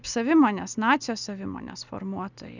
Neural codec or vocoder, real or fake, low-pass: none; real; 7.2 kHz